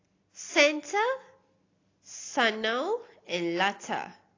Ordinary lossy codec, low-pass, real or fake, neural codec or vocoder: AAC, 32 kbps; 7.2 kHz; real; none